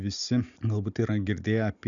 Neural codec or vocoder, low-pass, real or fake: none; 7.2 kHz; real